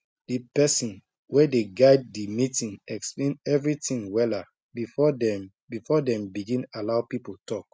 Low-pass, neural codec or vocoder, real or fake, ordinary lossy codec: none; none; real; none